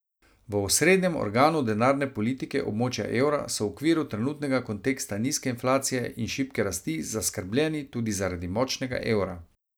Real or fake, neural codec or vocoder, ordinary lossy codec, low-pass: real; none; none; none